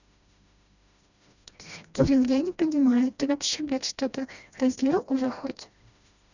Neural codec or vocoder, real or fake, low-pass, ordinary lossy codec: codec, 16 kHz, 1 kbps, FreqCodec, smaller model; fake; 7.2 kHz; none